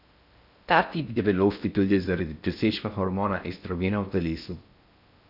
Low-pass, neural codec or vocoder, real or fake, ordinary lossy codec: 5.4 kHz; codec, 16 kHz in and 24 kHz out, 0.6 kbps, FocalCodec, streaming, 4096 codes; fake; none